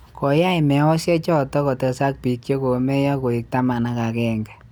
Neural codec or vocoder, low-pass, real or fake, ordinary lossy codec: none; none; real; none